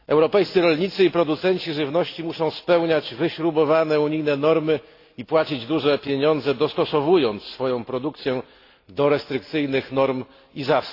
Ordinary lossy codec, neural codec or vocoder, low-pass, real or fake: AAC, 32 kbps; none; 5.4 kHz; real